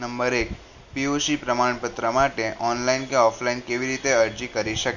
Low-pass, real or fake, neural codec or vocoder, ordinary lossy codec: 7.2 kHz; real; none; Opus, 64 kbps